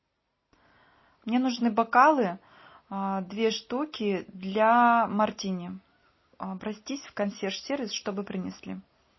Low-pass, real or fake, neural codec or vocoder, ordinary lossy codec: 7.2 kHz; real; none; MP3, 24 kbps